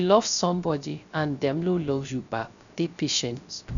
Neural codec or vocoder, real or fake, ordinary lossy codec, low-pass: codec, 16 kHz, 0.3 kbps, FocalCodec; fake; none; 7.2 kHz